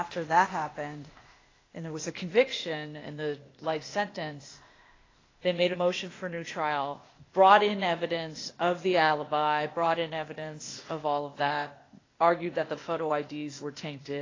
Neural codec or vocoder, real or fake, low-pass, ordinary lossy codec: codec, 16 kHz, 0.8 kbps, ZipCodec; fake; 7.2 kHz; AAC, 32 kbps